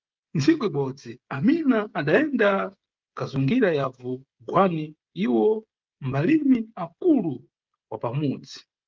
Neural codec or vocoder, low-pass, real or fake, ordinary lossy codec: codec, 16 kHz, 16 kbps, FreqCodec, smaller model; 7.2 kHz; fake; Opus, 32 kbps